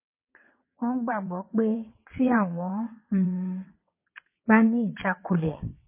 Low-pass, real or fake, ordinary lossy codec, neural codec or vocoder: 3.6 kHz; fake; MP3, 24 kbps; vocoder, 22.05 kHz, 80 mel bands, WaveNeXt